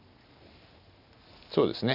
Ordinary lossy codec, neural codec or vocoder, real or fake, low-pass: none; none; real; 5.4 kHz